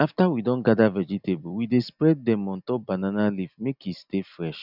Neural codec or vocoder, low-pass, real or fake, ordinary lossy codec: none; 5.4 kHz; real; none